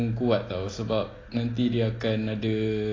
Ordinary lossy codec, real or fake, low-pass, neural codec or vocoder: AAC, 32 kbps; real; 7.2 kHz; none